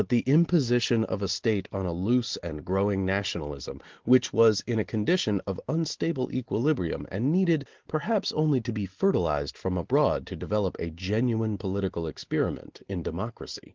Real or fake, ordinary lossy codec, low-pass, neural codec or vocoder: real; Opus, 16 kbps; 7.2 kHz; none